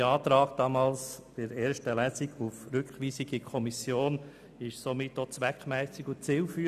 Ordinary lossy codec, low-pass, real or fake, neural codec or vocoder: none; 14.4 kHz; real; none